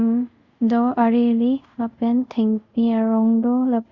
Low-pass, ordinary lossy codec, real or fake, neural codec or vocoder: 7.2 kHz; Opus, 64 kbps; fake; codec, 24 kHz, 0.5 kbps, DualCodec